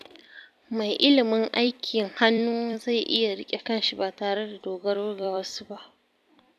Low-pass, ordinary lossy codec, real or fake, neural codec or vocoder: 14.4 kHz; none; fake; vocoder, 44.1 kHz, 128 mel bands every 256 samples, BigVGAN v2